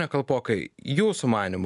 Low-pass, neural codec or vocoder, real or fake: 10.8 kHz; none; real